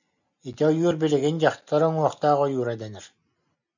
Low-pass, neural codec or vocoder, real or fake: 7.2 kHz; none; real